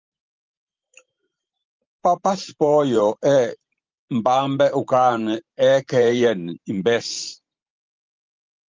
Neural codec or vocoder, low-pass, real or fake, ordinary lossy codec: vocoder, 44.1 kHz, 128 mel bands every 512 samples, BigVGAN v2; 7.2 kHz; fake; Opus, 24 kbps